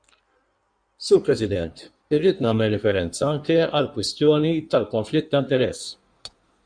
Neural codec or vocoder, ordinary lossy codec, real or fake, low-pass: codec, 16 kHz in and 24 kHz out, 1.1 kbps, FireRedTTS-2 codec; MP3, 96 kbps; fake; 9.9 kHz